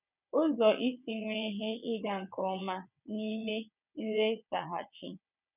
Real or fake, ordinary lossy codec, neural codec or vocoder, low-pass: fake; none; vocoder, 22.05 kHz, 80 mel bands, WaveNeXt; 3.6 kHz